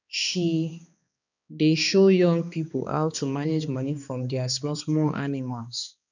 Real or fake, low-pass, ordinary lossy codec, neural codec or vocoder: fake; 7.2 kHz; none; codec, 16 kHz, 2 kbps, X-Codec, HuBERT features, trained on balanced general audio